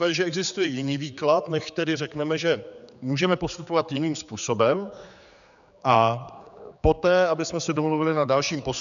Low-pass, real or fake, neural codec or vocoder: 7.2 kHz; fake; codec, 16 kHz, 4 kbps, X-Codec, HuBERT features, trained on general audio